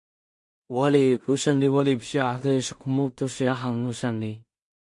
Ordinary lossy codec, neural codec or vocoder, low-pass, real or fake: MP3, 48 kbps; codec, 16 kHz in and 24 kHz out, 0.4 kbps, LongCat-Audio-Codec, two codebook decoder; 10.8 kHz; fake